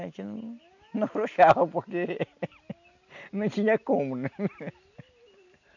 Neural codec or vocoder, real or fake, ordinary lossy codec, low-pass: none; real; none; 7.2 kHz